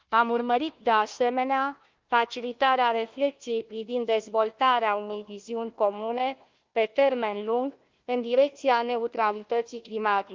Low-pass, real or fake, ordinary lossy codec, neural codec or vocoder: 7.2 kHz; fake; Opus, 24 kbps; codec, 16 kHz, 1 kbps, FunCodec, trained on Chinese and English, 50 frames a second